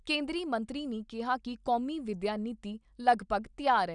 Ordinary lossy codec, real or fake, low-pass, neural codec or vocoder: none; real; 9.9 kHz; none